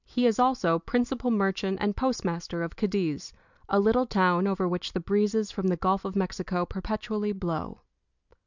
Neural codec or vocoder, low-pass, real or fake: none; 7.2 kHz; real